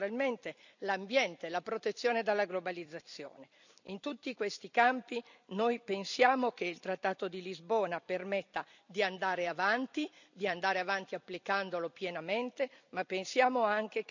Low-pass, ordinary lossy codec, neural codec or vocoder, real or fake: 7.2 kHz; none; vocoder, 44.1 kHz, 128 mel bands every 256 samples, BigVGAN v2; fake